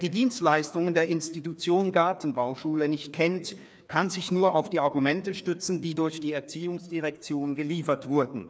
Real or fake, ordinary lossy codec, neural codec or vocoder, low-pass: fake; none; codec, 16 kHz, 2 kbps, FreqCodec, larger model; none